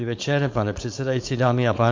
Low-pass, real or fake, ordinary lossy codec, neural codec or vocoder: 7.2 kHz; fake; MP3, 48 kbps; codec, 16 kHz, 4.8 kbps, FACodec